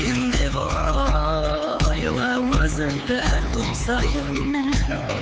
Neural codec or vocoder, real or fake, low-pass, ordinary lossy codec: codec, 16 kHz, 4 kbps, X-Codec, HuBERT features, trained on LibriSpeech; fake; none; none